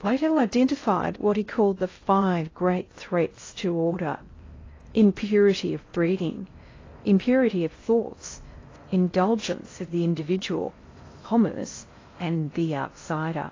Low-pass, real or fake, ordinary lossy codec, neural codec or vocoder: 7.2 kHz; fake; AAC, 32 kbps; codec, 16 kHz in and 24 kHz out, 0.6 kbps, FocalCodec, streaming, 2048 codes